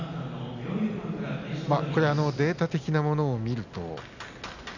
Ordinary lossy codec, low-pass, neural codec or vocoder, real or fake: none; 7.2 kHz; none; real